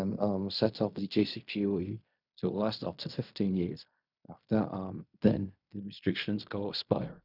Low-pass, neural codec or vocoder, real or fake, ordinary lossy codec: 5.4 kHz; codec, 16 kHz in and 24 kHz out, 0.4 kbps, LongCat-Audio-Codec, fine tuned four codebook decoder; fake; none